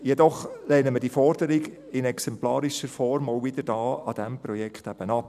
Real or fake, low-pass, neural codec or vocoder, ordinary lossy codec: real; 14.4 kHz; none; none